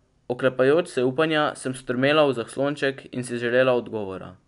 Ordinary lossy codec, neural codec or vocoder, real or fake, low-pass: none; none; real; 10.8 kHz